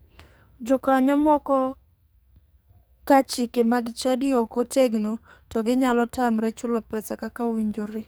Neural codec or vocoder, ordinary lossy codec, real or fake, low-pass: codec, 44.1 kHz, 2.6 kbps, SNAC; none; fake; none